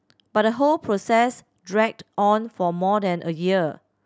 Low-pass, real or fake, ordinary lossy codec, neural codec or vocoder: none; real; none; none